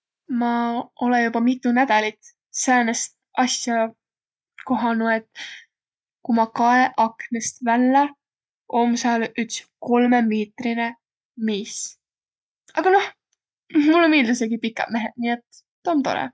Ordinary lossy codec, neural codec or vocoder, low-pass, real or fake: none; none; none; real